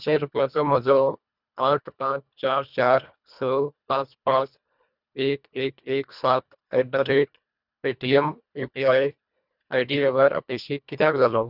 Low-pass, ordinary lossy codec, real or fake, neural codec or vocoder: 5.4 kHz; none; fake; codec, 24 kHz, 1.5 kbps, HILCodec